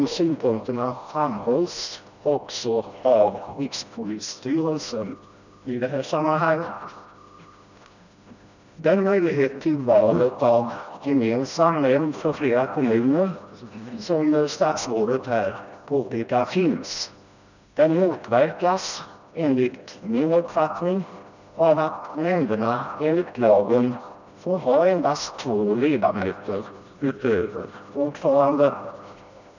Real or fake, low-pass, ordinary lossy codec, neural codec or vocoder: fake; 7.2 kHz; none; codec, 16 kHz, 1 kbps, FreqCodec, smaller model